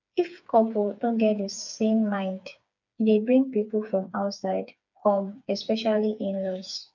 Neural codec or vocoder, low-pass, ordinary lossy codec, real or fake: codec, 16 kHz, 4 kbps, FreqCodec, smaller model; 7.2 kHz; none; fake